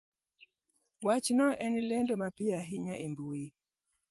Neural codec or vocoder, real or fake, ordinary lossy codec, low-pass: vocoder, 24 kHz, 100 mel bands, Vocos; fake; Opus, 32 kbps; 10.8 kHz